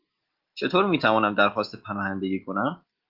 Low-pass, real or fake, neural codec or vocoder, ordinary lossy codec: 5.4 kHz; real; none; Opus, 32 kbps